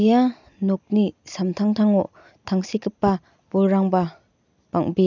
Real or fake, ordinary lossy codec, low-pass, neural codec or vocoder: real; none; 7.2 kHz; none